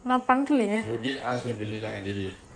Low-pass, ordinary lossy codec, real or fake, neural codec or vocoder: 9.9 kHz; none; fake; codec, 16 kHz in and 24 kHz out, 1.1 kbps, FireRedTTS-2 codec